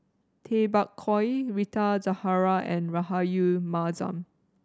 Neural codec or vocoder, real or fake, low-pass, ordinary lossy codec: none; real; none; none